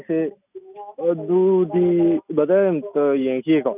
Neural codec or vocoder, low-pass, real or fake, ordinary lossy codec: none; 3.6 kHz; real; none